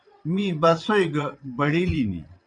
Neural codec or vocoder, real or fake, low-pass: vocoder, 22.05 kHz, 80 mel bands, WaveNeXt; fake; 9.9 kHz